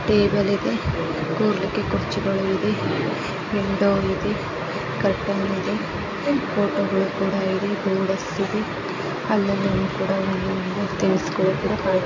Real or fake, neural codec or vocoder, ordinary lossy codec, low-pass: real; none; MP3, 48 kbps; 7.2 kHz